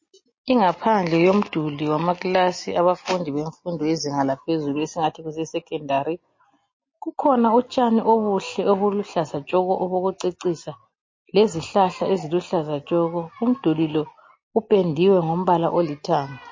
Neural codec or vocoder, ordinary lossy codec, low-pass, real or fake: none; MP3, 32 kbps; 7.2 kHz; real